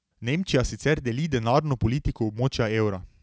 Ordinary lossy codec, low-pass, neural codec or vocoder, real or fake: none; none; none; real